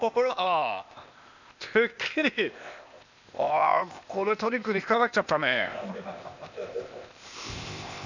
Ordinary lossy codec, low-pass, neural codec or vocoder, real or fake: none; 7.2 kHz; codec, 16 kHz, 0.8 kbps, ZipCodec; fake